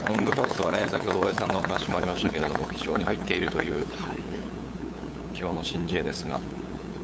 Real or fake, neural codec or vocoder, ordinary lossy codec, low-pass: fake; codec, 16 kHz, 8 kbps, FunCodec, trained on LibriTTS, 25 frames a second; none; none